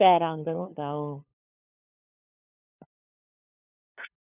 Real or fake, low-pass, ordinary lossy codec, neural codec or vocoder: fake; 3.6 kHz; none; codec, 16 kHz, 2 kbps, FunCodec, trained on LibriTTS, 25 frames a second